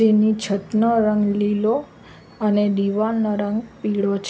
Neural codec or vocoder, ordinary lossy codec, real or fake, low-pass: none; none; real; none